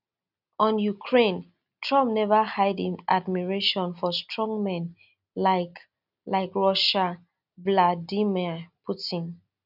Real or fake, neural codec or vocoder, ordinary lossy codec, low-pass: real; none; none; 5.4 kHz